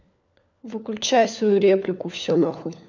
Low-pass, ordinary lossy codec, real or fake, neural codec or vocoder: 7.2 kHz; none; fake; codec, 16 kHz, 4 kbps, FunCodec, trained on LibriTTS, 50 frames a second